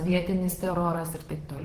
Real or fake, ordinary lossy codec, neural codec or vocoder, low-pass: fake; Opus, 16 kbps; vocoder, 44.1 kHz, 128 mel bands, Pupu-Vocoder; 14.4 kHz